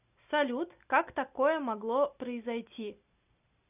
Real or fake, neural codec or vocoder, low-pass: real; none; 3.6 kHz